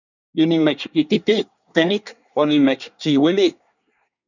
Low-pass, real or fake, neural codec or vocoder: 7.2 kHz; fake; codec, 24 kHz, 1 kbps, SNAC